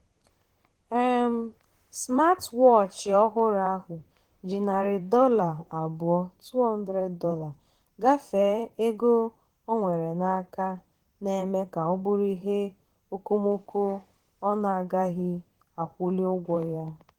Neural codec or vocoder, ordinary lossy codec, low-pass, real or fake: vocoder, 44.1 kHz, 128 mel bands, Pupu-Vocoder; Opus, 16 kbps; 19.8 kHz; fake